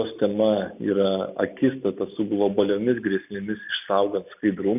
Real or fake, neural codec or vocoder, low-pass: real; none; 3.6 kHz